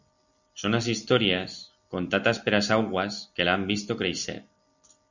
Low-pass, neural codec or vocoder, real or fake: 7.2 kHz; none; real